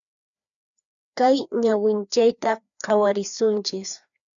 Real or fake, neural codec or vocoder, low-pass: fake; codec, 16 kHz, 2 kbps, FreqCodec, larger model; 7.2 kHz